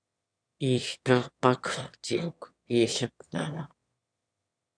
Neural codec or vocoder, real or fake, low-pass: autoencoder, 22.05 kHz, a latent of 192 numbers a frame, VITS, trained on one speaker; fake; 9.9 kHz